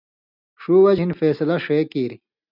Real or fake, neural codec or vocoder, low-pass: real; none; 5.4 kHz